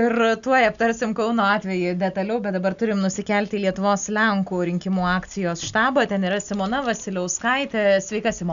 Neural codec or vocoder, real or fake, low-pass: none; real; 7.2 kHz